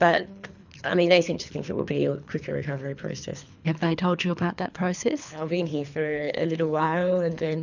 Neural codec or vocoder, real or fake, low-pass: codec, 24 kHz, 3 kbps, HILCodec; fake; 7.2 kHz